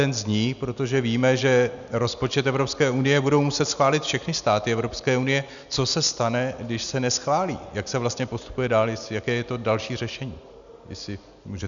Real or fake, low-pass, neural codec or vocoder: real; 7.2 kHz; none